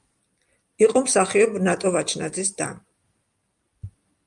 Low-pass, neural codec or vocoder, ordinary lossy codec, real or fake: 10.8 kHz; none; Opus, 32 kbps; real